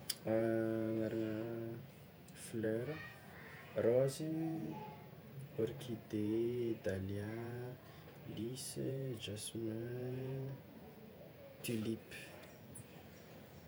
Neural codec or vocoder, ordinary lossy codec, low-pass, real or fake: vocoder, 48 kHz, 128 mel bands, Vocos; none; none; fake